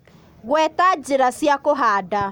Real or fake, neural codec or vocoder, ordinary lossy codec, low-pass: real; none; none; none